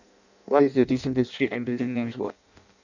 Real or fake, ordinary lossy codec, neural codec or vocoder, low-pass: fake; none; codec, 16 kHz in and 24 kHz out, 0.6 kbps, FireRedTTS-2 codec; 7.2 kHz